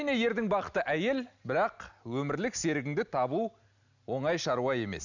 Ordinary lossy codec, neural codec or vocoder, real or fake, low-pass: none; none; real; 7.2 kHz